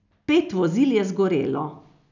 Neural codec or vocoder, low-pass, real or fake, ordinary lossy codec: none; 7.2 kHz; real; none